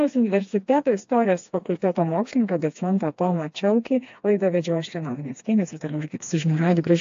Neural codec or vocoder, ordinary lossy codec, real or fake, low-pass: codec, 16 kHz, 2 kbps, FreqCodec, smaller model; AAC, 48 kbps; fake; 7.2 kHz